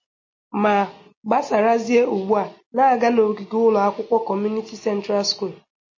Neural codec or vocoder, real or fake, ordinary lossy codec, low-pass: none; real; MP3, 32 kbps; 7.2 kHz